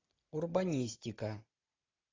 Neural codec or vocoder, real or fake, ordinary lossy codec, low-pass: none; real; AAC, 32 kbps; 7.2 kHz